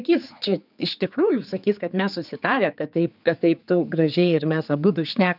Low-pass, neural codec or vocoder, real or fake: 5.4 kHz; codec, 16 kHz, 4 kbps, X-Codec, HuBERT features, trained on general audio; fake